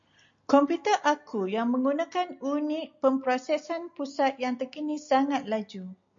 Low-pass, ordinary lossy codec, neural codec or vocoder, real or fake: 7.2 kHz; MP3, 48 kbps; none; real